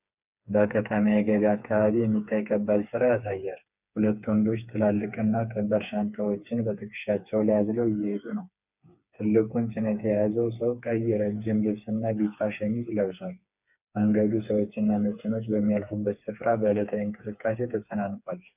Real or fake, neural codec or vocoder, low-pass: fake; codec, 16 kHz, 4 kbps, FreqCodec, smaller model; 3.6 kHz